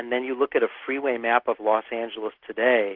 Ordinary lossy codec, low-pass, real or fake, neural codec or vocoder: Opus, 16 kbps; 5.4 kHz; real; none